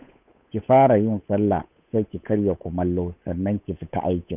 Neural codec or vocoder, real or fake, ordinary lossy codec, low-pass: codec, 16 kHz, 8 kbps, FunCodec, trained on Chinese and English, 25 frames a second; fake; none; 3.6 kHz